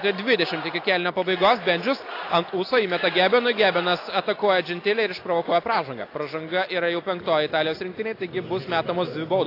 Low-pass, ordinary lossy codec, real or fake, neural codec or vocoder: 5.4 kHz; AAC, 32 kbps; real; none